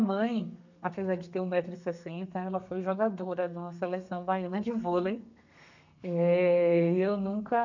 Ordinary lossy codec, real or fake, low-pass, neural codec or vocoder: none; fake; 7.2 kHz; codec, 32 kHz, 1.9 kbps, SNAC